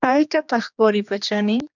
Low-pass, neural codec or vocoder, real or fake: 7.2 kHz; codec, 16 kHz, 2 kbps, X-Codec, HuBERT features, trained on general audio; fake